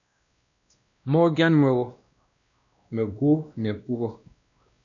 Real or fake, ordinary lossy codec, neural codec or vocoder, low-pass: fake; MP3, 96 kbps; codec, 16 kHz, 1 kbps, X-Codec, WavLM features, trained on Multilingual LibriSpeech; 7.2 kHz